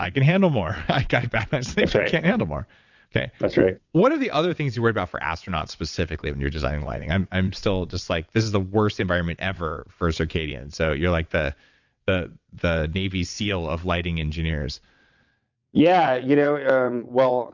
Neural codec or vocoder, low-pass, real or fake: vocoder, 22.05 kHz, 80 mel bands, WaveNeXt; 7.2 kHz; fake